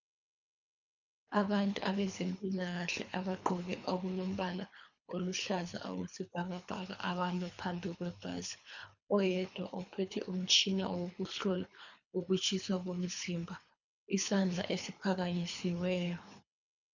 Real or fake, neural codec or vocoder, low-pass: fake; codec, 24 kHz, 3 kbps, HILCodec; 7.2 kHz